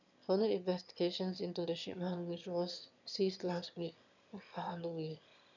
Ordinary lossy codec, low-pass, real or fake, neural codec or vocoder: none; 7.2 kHz; fake; autoencoder, 22.05 kHz, a latent of 192 numbers a frame, VITS, trained on one speaker